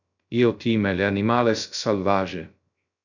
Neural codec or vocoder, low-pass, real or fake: codec, 16 kHz, 0.3 kbps, FocalCodec; 7.2 kHz; fake